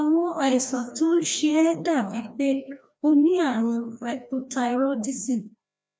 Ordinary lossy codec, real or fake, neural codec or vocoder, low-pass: none; fake; codec, 16 kHz, 1 kbps, FreqCodec, larger model; none